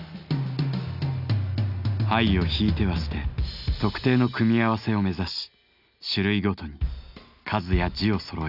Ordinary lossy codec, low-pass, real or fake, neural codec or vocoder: none; 5.4 kHz; real; none